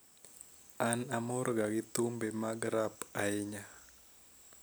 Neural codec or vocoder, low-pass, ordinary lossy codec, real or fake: none; none; none; real